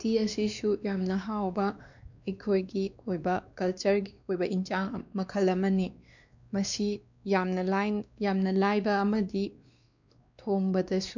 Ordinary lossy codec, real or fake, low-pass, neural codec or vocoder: none; fake; 7.2 kHz; codec, 16 kHz, 2 kbps, X-Codec, WavLM features, trained on Multilingual LibriSpeech